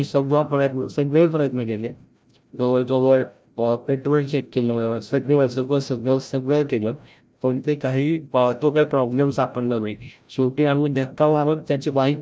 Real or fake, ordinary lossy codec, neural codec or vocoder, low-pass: fake; none; codec, 16 kHz, 0.5 kbps, FreqCodec, larger model; none